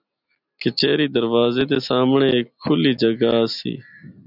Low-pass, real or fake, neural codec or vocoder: 5.4 kHz; real; none